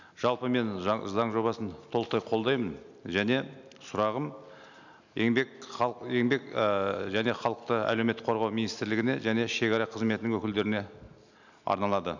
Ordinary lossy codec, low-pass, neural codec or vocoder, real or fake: none; 7.2 kHz; none; real